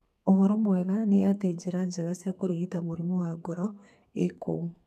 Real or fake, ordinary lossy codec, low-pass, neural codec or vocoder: fake; none; 14.4 kHz; codec, 32 kHz, 1.9 kbps, SNAC